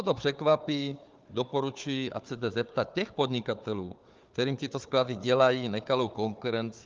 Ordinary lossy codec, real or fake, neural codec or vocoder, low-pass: Opus, 24 kbps; fake; codec, 16 kHz, 4 kbps, FunCodec, trained on Chinese and English, 50 frames a second; 7.2 kHz